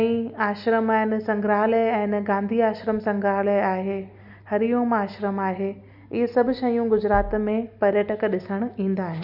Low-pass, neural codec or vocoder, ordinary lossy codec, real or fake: 5.4 kHz; none; Opus, 64 kbps; real